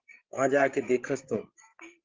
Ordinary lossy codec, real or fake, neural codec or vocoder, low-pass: Opus, 16 kbps; fake; codec, 16 kHz, 16 kbps, FreqCodec, larger model; 7.2 kHz